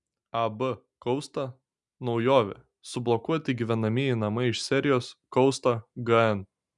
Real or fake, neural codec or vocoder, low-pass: real; none; 10.8 kHz